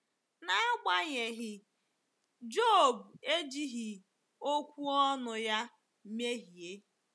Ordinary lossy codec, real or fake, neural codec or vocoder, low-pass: none; real; none; none